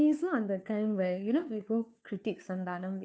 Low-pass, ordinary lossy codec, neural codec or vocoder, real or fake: none; none; codec, 16 kHz, 2 kbps, FunCodec, trained on Chinese and English, 25 frames a second; fake